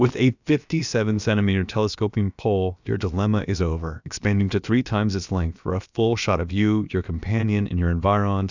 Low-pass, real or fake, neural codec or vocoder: 7.2 kHz; fake; codec, 16 kHz, about 1 kbps, DyCAST, with the encoder's durations